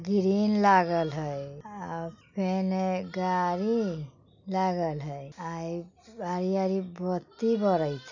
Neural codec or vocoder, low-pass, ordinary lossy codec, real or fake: none; 7.2 kHz; none; real